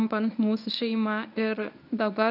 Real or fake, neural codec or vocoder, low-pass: fake; codec, 24 kHz, 0.9 kbps, WavTokenizer, medium speech release version 1; 5.4 kHz